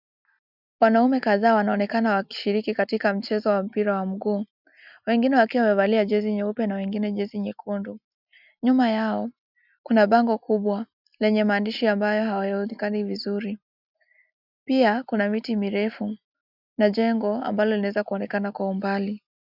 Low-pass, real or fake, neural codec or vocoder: 5.4 kHz; real; none